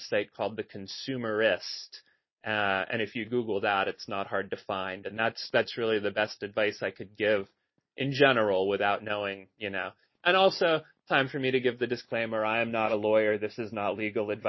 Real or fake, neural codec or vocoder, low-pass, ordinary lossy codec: real; none; 7.2 kHz; MP3, 24 kbps